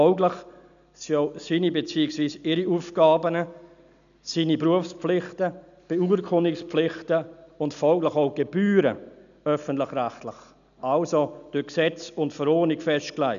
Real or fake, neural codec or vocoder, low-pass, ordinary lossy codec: real; none; 7.2 kHz; none